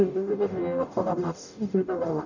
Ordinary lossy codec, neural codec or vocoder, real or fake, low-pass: none; codec, 44.1 kHz, 0.9 kbps, DAC; fake; 7.2 kHz